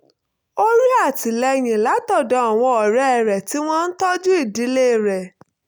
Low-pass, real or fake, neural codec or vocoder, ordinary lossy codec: none; real; none; none